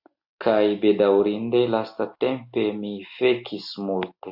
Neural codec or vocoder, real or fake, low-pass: none; real; 5.4 kHz